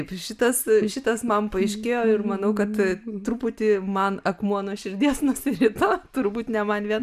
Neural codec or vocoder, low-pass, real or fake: none; 14.4 kHz; real